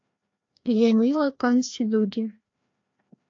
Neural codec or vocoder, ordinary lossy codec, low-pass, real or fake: codec, 16 kHz, 1 kbps, FreqCodec, larger model; AAC, 48 kbps; 7.2 kHz; fake